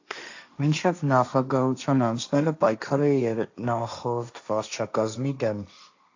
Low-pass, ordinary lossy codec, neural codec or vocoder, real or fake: 7.2 kHz; AAC, 48 kbps; codec, 16 kHz, 1.1 kbps, Voila-Tokenizer; fake